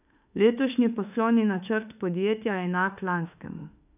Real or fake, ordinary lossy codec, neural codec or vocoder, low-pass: fake; none; autoencoder, 48 kHz, 32 numbers a frame, DAC-VAE, trained on Japanese speech; 3.6 kHz